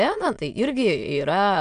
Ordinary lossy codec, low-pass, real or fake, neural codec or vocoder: MP3, 96 kbps; 9.9 kHz; fake; autoencoder, 22.05 kHz, a latent of 192 numbers a frame, VITS, trained on many speakers